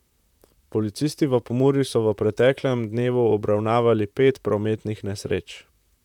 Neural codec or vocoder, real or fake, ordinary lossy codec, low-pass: vocoder, 44.1 kHz, 128 mel bands, Pupu-Vocoder; fake; none; 19.8 kHz